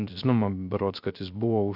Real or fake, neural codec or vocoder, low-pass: fake; codec, 16 kHz, 0.3 kbps, FocalCodec; 5.4 kHz